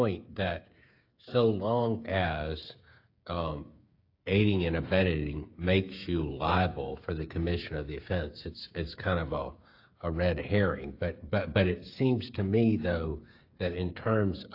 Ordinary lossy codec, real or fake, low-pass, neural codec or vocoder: AAC, 32 kbps; fake; 5.4 kHz; codec, 16 kHz, 8 kbps, FreqCodec, smaller model